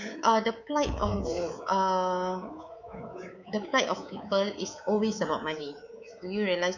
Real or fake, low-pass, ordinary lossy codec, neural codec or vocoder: fake; 7.2 kHz; none; codec, 24 kHz, 3.1 kbps, DualCodec